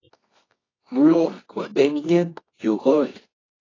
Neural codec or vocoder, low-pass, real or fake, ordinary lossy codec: codec, 24 kHz, 0.9 kbps, WavTokenizer, medium music audio release; 7.2 kHz; fake; AAC, 32 kbps